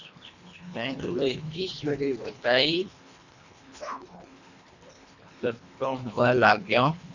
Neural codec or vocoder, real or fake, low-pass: codec, 24 kHz, 1.5 kbps, HILCodec; fake; 7.2 kHz